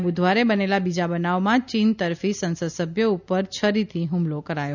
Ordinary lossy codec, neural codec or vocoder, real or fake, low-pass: none; none; real; 7.2 kHz